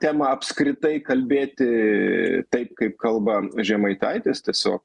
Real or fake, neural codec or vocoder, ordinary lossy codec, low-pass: real; none; Opus, 64 kbps; 10.8 kHz